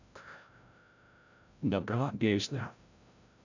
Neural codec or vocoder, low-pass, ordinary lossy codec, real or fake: codec, 16 kHz, 0.5 kbps, FreqCodec, larger model; 7.2 kHz; none; fake